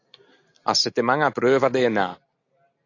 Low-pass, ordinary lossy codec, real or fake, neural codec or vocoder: 7.2 kHz; AAC, 48 kbps; real; none